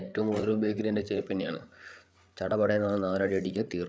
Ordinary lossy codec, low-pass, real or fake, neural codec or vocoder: none; none; fake; codec, 16 kHz, 8 kbps, FreqCodec, larger model